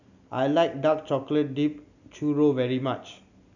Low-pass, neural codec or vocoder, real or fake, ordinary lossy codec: 7.2 kHz; none; real; none